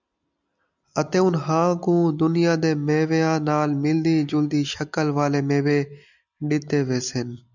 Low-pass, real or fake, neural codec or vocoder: 7.2 kHz; real; none